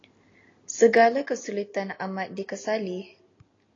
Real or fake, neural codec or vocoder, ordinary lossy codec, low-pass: real; none; AAC, 32 kbps; 7.2 kHz